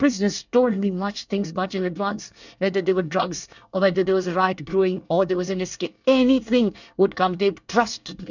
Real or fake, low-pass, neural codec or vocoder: fake; 7.2 kHz; codec, 24 kHz, 1 kbps, SNAC